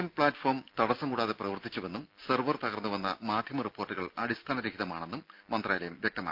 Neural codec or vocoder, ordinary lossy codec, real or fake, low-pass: none; Opus, 32 kbps; real; 5.4 kHz